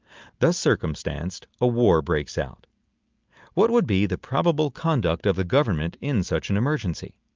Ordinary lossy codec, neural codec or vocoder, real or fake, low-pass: Opus, 24 kbps; none; real; 7.2 kHz